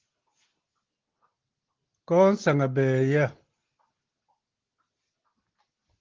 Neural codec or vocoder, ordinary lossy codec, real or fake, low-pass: none; Opus, 16 kbps; real; 7.2 kHz